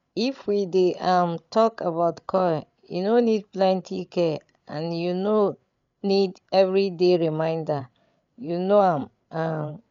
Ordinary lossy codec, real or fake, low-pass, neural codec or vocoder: none; fake; 7.2 kHz; codec, 16 kHz, 8 kbps, FreqCodec, larger model